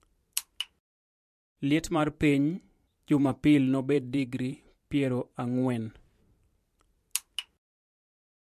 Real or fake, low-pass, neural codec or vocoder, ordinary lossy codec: fake; 14.4 kHz; vocoder, 44.1 kHz, 128 mel bands every 512 samples, BigVGAN v2; MP3, 64 kbps